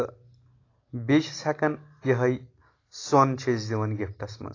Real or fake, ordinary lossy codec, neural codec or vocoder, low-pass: real; AAC, 32 kbps; none; 7.2 kHz